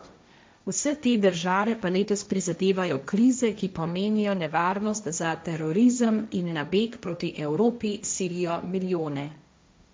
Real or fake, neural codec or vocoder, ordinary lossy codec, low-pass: fake; codec, 16 kHz, 1.1 kbps, Voila-Tokenizer; none; none